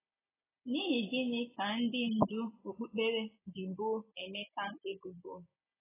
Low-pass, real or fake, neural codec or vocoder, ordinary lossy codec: 3.6 kHz; real; none; AAC, 16 kbps